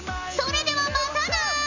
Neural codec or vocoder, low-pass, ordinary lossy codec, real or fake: none; 7.2 kHz; none; real